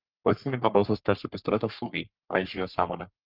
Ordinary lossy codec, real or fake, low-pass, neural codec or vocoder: Opus, 32 kbps; fake; 5.4 kHz; codec, 44.1 kHz, 3.4 kbps, Pupu-Codec